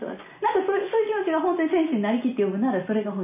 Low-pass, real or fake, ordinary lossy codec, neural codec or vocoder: 3.6 kHz; real; none; none